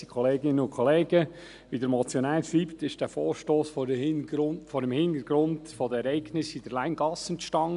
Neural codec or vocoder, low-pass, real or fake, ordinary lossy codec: none; 10.8 kHz; real; none